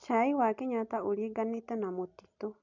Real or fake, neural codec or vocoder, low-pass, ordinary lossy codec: real; none; 7.2 kHz; none